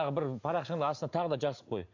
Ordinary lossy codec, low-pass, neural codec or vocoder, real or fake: AAC, 48 kbps; 7.2 kHz; none; real